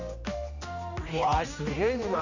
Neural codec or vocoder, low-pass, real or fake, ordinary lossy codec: codec, 16 kHz, 1 kbps, X-Codec, HuBERT features, trained on general audio; 7.2 kHz; fake; none